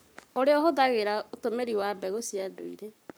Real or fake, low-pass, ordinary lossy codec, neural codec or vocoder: fake; none; none; codec, 44.1 kHz, 7.8 kbps, Pupu-Codec